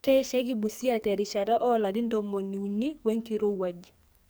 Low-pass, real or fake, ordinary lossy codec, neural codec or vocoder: none; fake; none; codec, 44.1 kHz, 2.6 kbps, SNAC